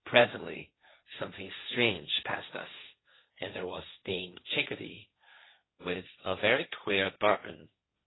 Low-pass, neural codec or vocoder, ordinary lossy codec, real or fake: 7.2 kHz; codec, 16 kHz, 1.1 kbps, Voila-Tokenizer; AAC, 16 kbps; fake